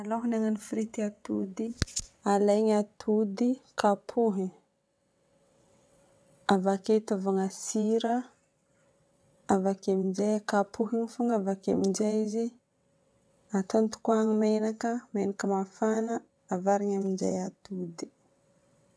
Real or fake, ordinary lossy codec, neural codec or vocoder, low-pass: fake; none; vocoder, 22.05 kHz, 80 mel bands, WaveNeXt; none